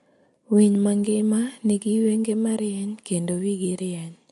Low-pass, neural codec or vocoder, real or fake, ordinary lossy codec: 14.4 kHz; none; real; MP3, 48 kbps